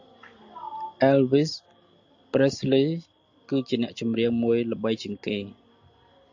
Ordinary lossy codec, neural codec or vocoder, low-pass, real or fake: AAC, 48 kbps; none; 7.2 kHz; real